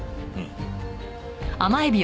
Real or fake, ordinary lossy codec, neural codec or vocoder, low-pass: real; none; none; none